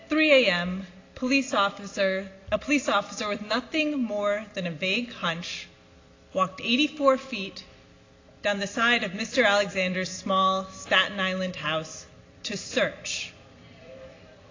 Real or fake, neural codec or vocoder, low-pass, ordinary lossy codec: real; none; 7.2 kHz; AAC, 32 kbps